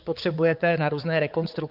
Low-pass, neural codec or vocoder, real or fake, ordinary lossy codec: 5.4 kHz; codec, 16 kHz, 4 kbps, X-Codec, HuBERT features, trained on balanced general audio; fake; Opus, 32 kbps